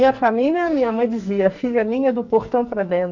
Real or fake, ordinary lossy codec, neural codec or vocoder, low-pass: fake; none; codec, 44.1 kHz, 2.6 kbps, SNAC; 7.2 kHz